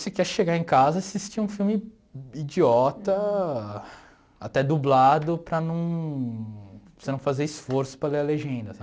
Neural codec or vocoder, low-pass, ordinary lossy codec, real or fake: none; none; none; real